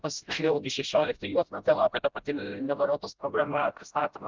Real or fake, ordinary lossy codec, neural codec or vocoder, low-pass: fake; Opus, 32 kbps; codec, 16 kHz, 0.5 kbps, FreqCodec, smaller model; 7.2 kHz